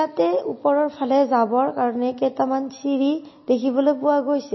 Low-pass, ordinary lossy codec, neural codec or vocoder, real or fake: 7.2 kHz; MP3, 24 kbps; none; real